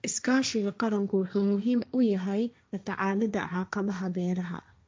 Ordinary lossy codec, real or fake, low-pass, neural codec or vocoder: none; fake; none; codec, 16 kHz, 1.1 kbps, Voila-Tokenizer